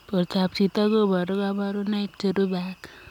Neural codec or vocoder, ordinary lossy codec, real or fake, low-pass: none; none; real; 19.8 kHz